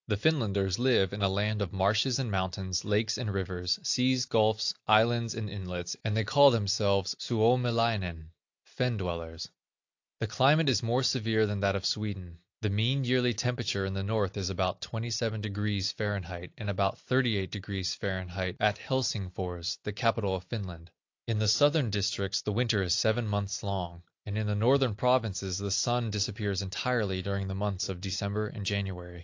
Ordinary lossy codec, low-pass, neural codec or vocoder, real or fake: AAC, 48 kbps; 7.2 kHz; none; real